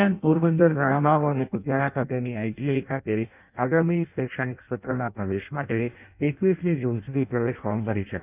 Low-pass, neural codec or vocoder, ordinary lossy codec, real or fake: 3.6 kHz; codec, 16 kHz in and 24 kHz out, 0.6 kbps, FireRedTTS-2 codec; none; fake